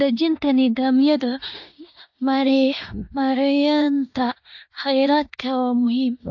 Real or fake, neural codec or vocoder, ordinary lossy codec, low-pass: fake; codec, 16 kHz in and 24 kHz out, 0.9 kbps, LongCat-Audio-Codec, four codebook decoder; AAC, 48 kbps; 7.2 kHz